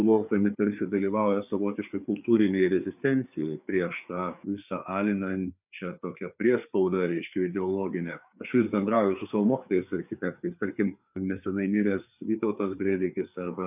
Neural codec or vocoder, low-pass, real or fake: codec, 16 kHz, 4 kbps, FreqCodec, larger model; 3.6 kHz; fake